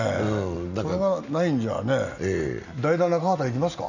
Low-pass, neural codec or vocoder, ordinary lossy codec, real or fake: 7.2 kHz; none; none; real